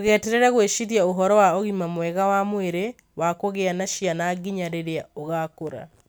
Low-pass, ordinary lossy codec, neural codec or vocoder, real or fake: none; none; none; real